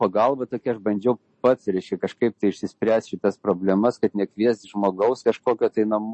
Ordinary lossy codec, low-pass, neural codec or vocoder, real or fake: MP3, 32 kbps; 10.8 kHz; none; real